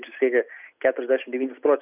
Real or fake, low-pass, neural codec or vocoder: real; 3.6 kHz; none